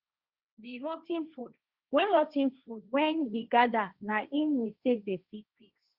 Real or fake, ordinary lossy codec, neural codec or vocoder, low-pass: fake; Opus, 64 kbps; codec, 16 kHz, 1.1 kbps, Voila-Tokenizer; 5.4 kHz